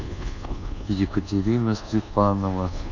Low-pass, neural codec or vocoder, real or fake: 7.2 kHz; codec, 24 kHz, 1.2 kbps, DualCodec; fake